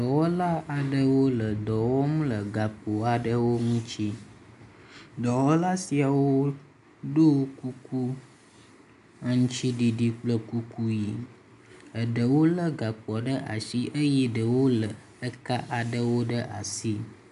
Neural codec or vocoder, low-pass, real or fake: none; 10.8 kHz; real